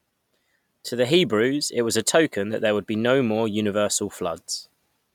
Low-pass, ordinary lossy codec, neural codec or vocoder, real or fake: 19.8 kHz; none; none; real